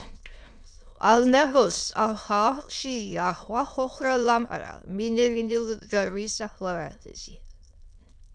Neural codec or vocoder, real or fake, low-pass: autoencoder, 22.05 kHz, a latent of 192 numbers a frame, VITS, trained on many speakers; fake; 9.9 kHz